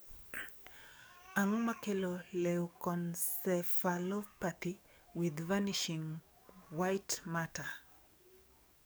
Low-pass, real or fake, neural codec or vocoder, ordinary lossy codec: none; fake; codec, 44.1 kHz, 7.8 kbps, DAC; none